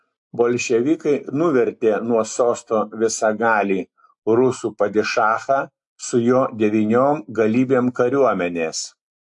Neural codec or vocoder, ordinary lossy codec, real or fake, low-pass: none; AAC, 64 kbps; real; 10.8 kHz